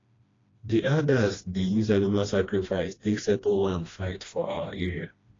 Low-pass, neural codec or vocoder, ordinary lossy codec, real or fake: 7.2 kHz; codec, 16 kHz, 1 kbps, FreqCodec, smaller model; AAC, 32 kbps; fake